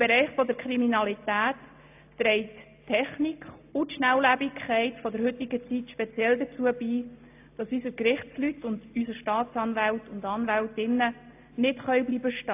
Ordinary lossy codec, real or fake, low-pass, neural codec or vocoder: AAC, 32 kbps; real; 3.6 kHz; none